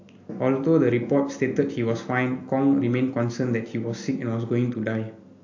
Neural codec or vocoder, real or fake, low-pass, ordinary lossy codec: none; real; 7.2 kHz; AAC, 48 kbps